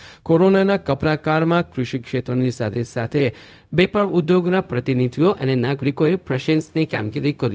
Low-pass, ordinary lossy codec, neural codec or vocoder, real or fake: none; none; codec, 16 kHz, 0.4 kbps, LongCat-Audio-Codec; fake